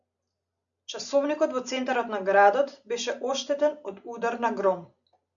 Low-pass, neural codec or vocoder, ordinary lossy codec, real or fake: 7.2 kHz; none; MP3, 96 kbps; real